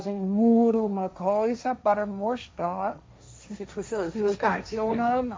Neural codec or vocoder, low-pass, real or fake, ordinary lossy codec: codec, 16 kHz, 1.1 kbps, Voila-Tokenizer; none; fake; none